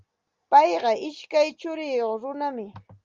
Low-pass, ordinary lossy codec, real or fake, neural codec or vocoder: 7.2 kHz; Opus, 32 kbps; real; none